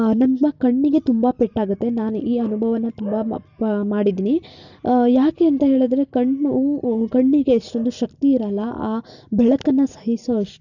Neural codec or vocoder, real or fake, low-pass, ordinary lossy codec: none; real; 7.2 kHz; none